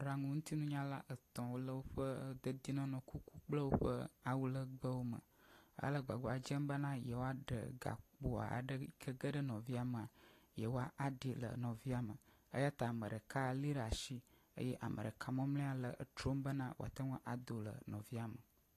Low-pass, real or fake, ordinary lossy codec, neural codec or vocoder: 14.4 kHz; real; AAC, 48 kbps; none